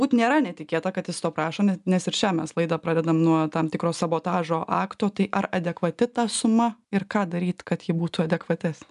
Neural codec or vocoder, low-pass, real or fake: none; 10.8 kHz; real